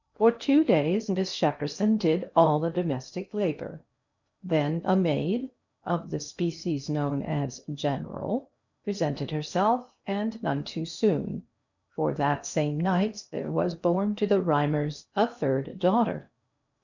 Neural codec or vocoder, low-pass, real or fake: codec, 16 kHz in and 24 kHz out, 0.8 kbps, FocalCodec, streaming, 65536 codes; 7.2 kHz; fake